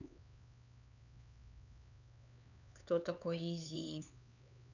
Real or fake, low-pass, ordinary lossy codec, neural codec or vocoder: fake; 7.2 kHz; none; codec, 16 kHz, 4 kbps, X-Codec, HuBERT features, trained on LibriSpeech